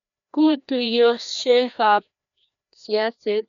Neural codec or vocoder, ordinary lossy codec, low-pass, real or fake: codec, 16 kHz, 1 kbps, FreqCodec, larger model; none; 7.2 kHz; fake